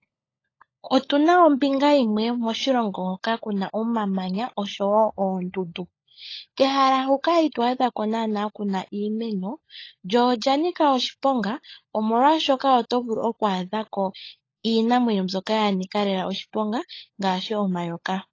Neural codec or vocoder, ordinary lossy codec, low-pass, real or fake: codec, 16 kHz, 16 kbps, FunCodec, trained on LibriTTS, 50 frames a second; AAC, 32 kbps; 7.2 kHz; fake